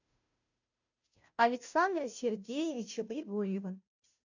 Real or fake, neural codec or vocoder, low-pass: fake; codec, 16 kHz, 0.5 kbps, FunCodec, trained on Chinese and English, 25 frames a second; 7.2 kHz